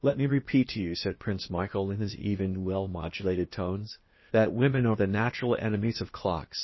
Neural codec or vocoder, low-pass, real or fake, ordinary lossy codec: codec, 16 kHz, 0.8 kbps, ZipCodec; 7.2 kHz; fake; MP3, 24 kbps